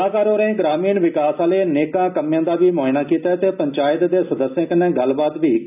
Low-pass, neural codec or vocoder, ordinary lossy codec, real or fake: 3.6 kHz; none; none; real